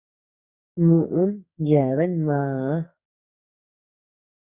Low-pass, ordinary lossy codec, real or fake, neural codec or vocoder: 3.6 kHz; Opus, 64 kbps; fake; codec, 44.1 kHz, 2.6 kbps, SNAC